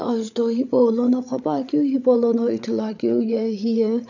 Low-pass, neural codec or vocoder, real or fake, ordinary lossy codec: 7.2 kHz; codec, 16 kHz, 8 kbps, FreqCodec, larger model; fake; none